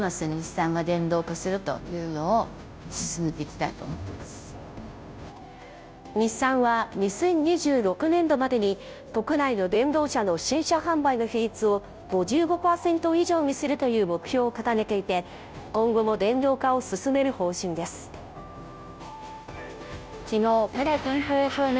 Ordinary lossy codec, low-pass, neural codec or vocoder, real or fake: none; none; codec, 16 kHz, 0.5 kbps, FunCodec, trained on Chinese and English, 25 frames a second; fake